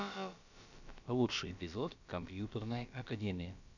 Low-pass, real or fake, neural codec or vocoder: 7.2 kHz; fake; codec, 16 kHz, about 1 kbps, DyCAST, with the encoder's durations